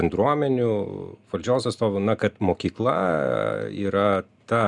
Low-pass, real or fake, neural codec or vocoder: 10.8 kHz; real; none